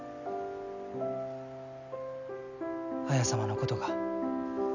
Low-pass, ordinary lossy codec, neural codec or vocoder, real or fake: 7.2 kHz; none; none; real